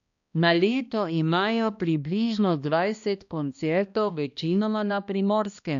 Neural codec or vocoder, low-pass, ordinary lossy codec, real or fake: codec, 16 kHz, 1 kbps, X-Codec, HuBERT features, trained on balanced general audio; 7.2 kHz; none; fake